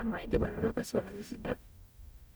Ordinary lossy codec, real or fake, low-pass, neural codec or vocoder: none; fake; none; codec, 44.1 kHz, 0.9 kbps, DAC